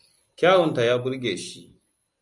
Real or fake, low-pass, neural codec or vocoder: real; 10.8 kHz; none